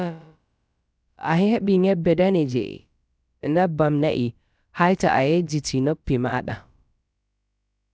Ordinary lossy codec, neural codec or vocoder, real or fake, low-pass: none; codec, 16 kHz, about 1 kbps, DyCAST, with the encoder's durations; fake; none